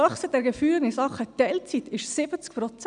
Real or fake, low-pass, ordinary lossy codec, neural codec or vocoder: real; 9.9 kHz; none; none